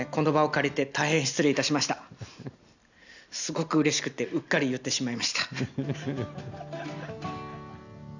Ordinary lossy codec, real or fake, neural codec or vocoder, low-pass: none; real; none; 7.2 kHz